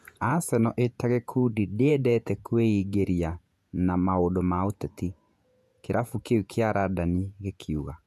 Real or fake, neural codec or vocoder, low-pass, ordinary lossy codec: fake; vocoder, 48 kHz, 128 mel bands, Vocos; 14.4 kHz; none